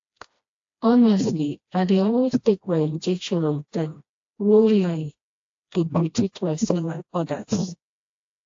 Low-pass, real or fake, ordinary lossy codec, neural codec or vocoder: 7.2 kHz; fake; AAC, 48 kbps; codec, 16 kHz, 1 kbps, FreqCodec, smaller model